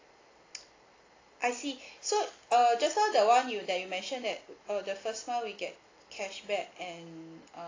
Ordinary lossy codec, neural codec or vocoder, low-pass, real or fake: AAC, 32 kbps; none; 7.2 kHz; real